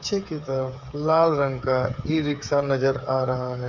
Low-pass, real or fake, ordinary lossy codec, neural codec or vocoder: 7.2 kHz; fake; none; codec, 16 kHz, 8 kbps, FreqCodec, smaller model